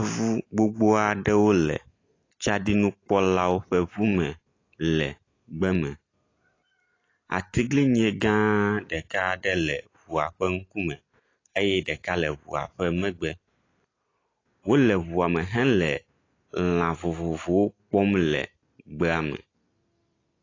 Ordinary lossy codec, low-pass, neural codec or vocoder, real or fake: AAC, 32 kbps; 7.2 kHz; none; real